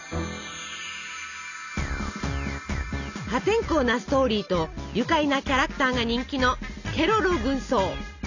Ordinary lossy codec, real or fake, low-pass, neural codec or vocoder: none; real; 7.2 kHz; none